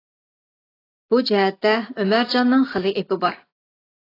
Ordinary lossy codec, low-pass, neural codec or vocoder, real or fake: AAC, 24 kbps; 5.4 kHz; none; real